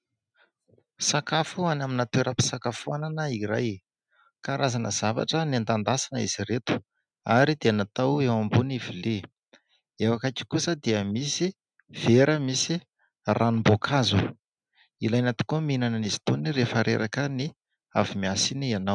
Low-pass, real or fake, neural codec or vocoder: 9.9 kHz; real; none